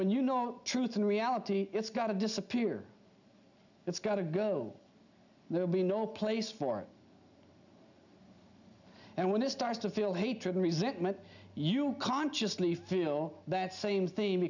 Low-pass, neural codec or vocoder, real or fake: 7.2 kHz; none; real